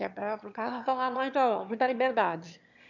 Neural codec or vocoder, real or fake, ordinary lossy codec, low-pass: autoencoder, 22.05 kHz, a latent of 192 numbers a frame, VITS, trained on one speaker; fake; none; 7.2 kHz